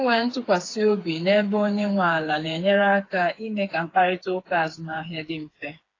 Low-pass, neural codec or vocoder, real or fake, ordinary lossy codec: 7.2 kHz; codec, 16 kHz, 4 kbps, FreqCodec, smaller model; fake; AAC, 32 kbps